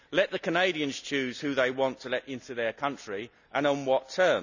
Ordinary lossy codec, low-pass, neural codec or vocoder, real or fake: none; 7.2 kHz; none; real